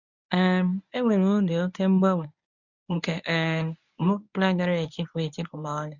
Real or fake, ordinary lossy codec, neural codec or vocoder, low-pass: fake; none; codec, 24 kHz, 0.9 kbps, WavTokenizer, medium speech release version 1; 7.2 kHz